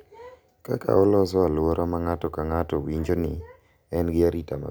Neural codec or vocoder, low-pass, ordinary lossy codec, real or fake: none; none; none; real